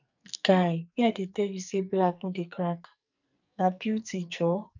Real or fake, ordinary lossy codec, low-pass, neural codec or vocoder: fake; none; 7.2 kHz; codec, 44.1 kHz, 2.6 kbps, SNAC